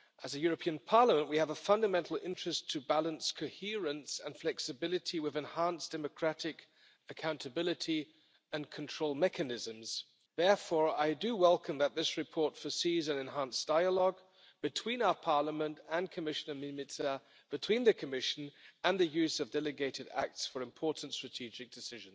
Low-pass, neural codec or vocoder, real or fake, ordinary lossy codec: none; none; real; none